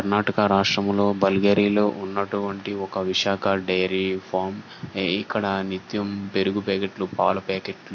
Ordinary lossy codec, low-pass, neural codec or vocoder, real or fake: AAC, 48 kbps; 7.2 kHz; none; real